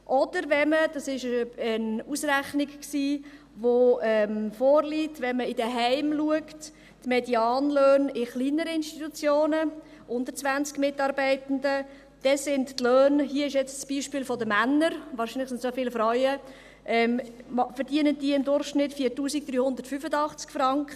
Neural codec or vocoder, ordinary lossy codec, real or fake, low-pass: none; none; real; 14.4 kHz